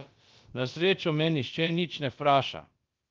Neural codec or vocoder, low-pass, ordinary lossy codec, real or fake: codec, 16 kHz, about 1 kbps, DyCAST, with the encoder's durations; 7.2 kHz; Opus, 32 kbps; fake